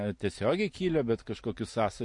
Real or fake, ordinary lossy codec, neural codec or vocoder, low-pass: real; MP3, 48 kbps; none; 10.8 kHz